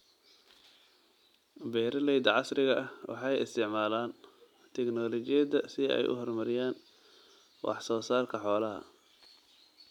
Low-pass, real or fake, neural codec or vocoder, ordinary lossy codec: 19.8 kHz; real; none; none